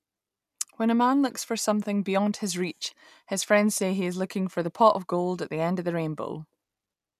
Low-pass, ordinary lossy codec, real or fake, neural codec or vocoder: 14.4 kHz; none; real; none